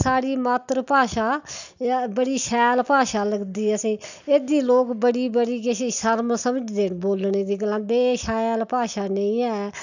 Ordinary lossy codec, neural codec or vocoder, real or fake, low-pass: none; none; real; 7.2 kHz